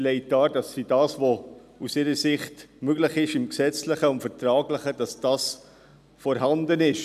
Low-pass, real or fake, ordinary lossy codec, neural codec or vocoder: 14.4 kHz; real; none; none